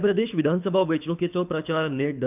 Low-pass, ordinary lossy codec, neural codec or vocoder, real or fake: 3.6 kHz; none; codec, 24 kHz, 6 kbps, HILCodec; fake